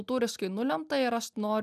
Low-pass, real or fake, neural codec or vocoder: 14.4 kHz; real; none